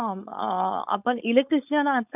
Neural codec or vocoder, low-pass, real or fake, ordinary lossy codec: codec, 16 kHz, 4.8 kbps, FACodec; 3.6 kHz; fake; none